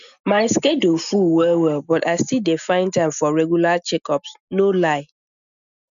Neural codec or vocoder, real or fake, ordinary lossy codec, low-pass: none; real; none; 7.2 kHz